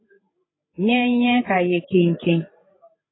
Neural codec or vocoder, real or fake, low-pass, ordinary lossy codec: codec, 16 kHz, 16 kbps, FreqCodec, larger model; fake; 7.2 kHz; AAC, 16 kbps